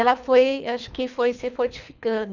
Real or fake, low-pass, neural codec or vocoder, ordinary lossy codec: fake; 7.2 kHz; codec, 24 kHz, 3 kbps, HILCodec; none